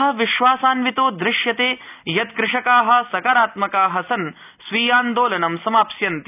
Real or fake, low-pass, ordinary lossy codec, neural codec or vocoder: real; 3.6 kHz; none; none